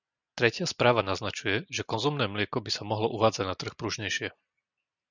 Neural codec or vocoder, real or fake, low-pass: none; real; 7.2 kHz